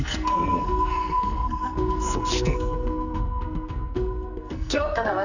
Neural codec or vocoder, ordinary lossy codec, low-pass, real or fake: codec, 44.1 kHz, 2.6 kbps, SNAC; none; 7.2 kHz; fake